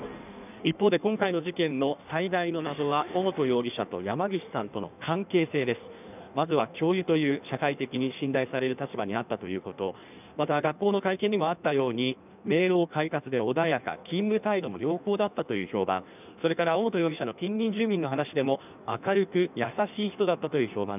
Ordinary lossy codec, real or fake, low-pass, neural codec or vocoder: none; fake; 3.6 kHz; codec, 16 kHz in and 24 kHz out, 1.1 kbps, FireRedTTS-2 codec